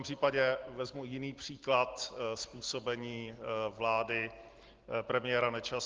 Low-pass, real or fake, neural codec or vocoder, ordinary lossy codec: 7.2 kHz; real; none; Opus, 16 kbps